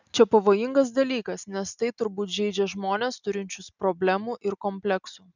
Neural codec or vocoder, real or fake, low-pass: none; real; 7.2 kHz